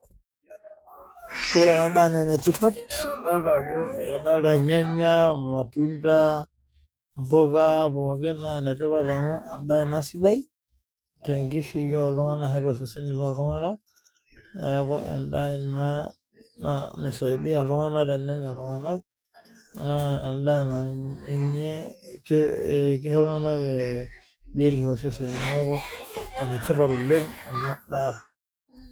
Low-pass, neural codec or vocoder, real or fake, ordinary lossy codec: none; codec, 44.1 kHz, 2.6 kbps, DAC; fake; none